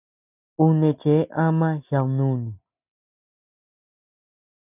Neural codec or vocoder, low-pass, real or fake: none; 3.6 kHz; real